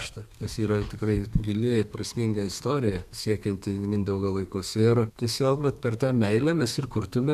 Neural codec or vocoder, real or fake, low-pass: codec, 32 kHz, 1.9 kbps, SNAC; fake; 14.4 kHz